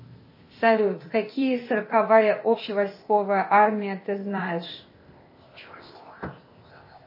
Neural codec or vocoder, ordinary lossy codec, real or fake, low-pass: codec, 16 kHz, 0.8 kbps, ZipCodec; MP3, 24 kbps; fake; 5.4 kHz